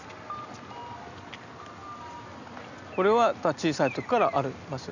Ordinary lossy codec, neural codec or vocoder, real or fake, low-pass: none; none; real; 7.2 kHz